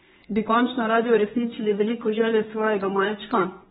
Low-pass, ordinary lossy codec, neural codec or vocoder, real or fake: 14.4 kHz; AAC, 16 kbps; codec, 32 kHz, 1.9 kbps, SNAC; fake